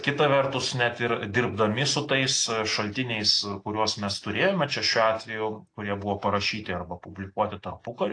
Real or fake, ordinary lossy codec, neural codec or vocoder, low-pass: real; AAC, 48 kbps; none; 9.9 kHz